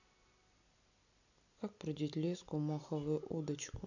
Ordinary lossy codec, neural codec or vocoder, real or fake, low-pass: none; none; real; 7.2 kHz